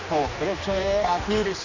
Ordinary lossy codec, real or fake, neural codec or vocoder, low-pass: none; fake; codec, 16 kHz, 2 kbps, X-Codec, HuBERT features, trained on general audio; 7.2 kHz